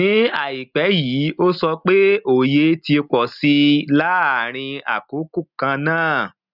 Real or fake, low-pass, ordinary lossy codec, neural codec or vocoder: real; 5.4 kHz; none; none